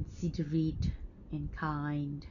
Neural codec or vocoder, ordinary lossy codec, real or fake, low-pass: none; none; real; 7.2 kHz